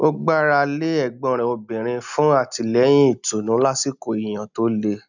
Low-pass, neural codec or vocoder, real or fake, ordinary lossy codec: 7.2 kHz; none; real; none